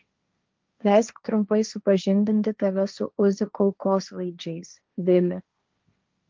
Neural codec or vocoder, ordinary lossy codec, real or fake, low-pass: codec, 16 kHz, 1.1 kbps, Voila-Tokenizer; Opus, 32 kbps; fake; 7.2 kHz